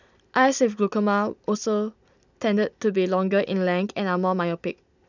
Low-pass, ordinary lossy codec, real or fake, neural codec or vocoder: 7.2 kHz; none; real; none